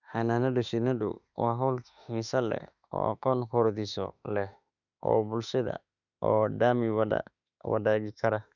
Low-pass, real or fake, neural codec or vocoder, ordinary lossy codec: 7.2 kHz; fake; autoencoder, 48 kHz, 32 numbers a frame, DAC-VAE, trained on Japanese speech; none